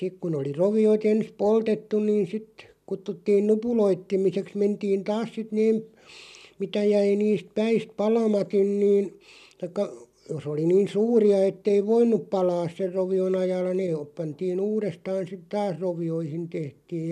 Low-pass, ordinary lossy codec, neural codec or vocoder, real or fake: 14.4 kHz; none; none; real